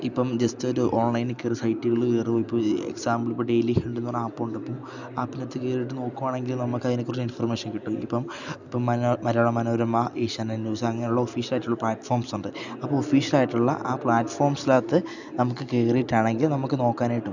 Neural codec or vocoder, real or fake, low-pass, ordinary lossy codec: none; real; 7.2 kHz; none